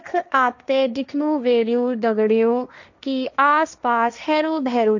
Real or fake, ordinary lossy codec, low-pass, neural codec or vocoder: fake; none; 7.2 kHz; codec, 16 kHz, 1.1 kbps, Voila-Tokenizer